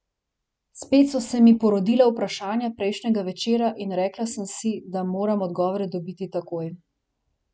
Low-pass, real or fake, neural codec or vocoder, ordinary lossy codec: none; real; none; none